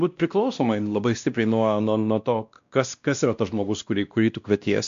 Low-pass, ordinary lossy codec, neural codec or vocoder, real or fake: 7.2 kHz; MP3, 64 kbps; codec, 16 kHz, 1 kbps, X-Codec, WavLM features, trained on Multilingual LibriSpeech; fake